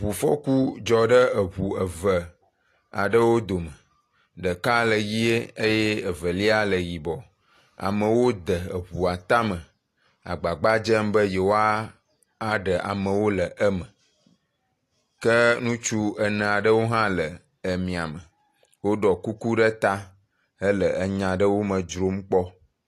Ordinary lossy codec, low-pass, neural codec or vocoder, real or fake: AAC, 48 kbps; 14.4 kHz; none; real